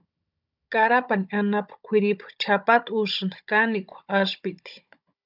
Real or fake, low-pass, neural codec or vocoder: fake; 5.4 kHz; codec, 16 kHz, 16 kbps, FunCodec, trained on Chinese and English, 50 frames a second